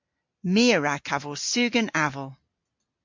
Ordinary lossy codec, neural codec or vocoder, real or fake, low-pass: MP3, 48 kbps; none; real; 7.2 kHz